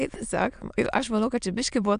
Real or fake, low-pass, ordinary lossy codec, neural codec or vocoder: fake; 9.9 kHz; AAC, 96 kbps; autoencoder, 22.05 kHz, a latent of 192 numbers a frame, VITS, trained on many speakers